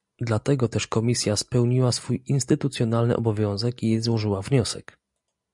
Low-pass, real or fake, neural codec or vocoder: 10.8 kHz; real; none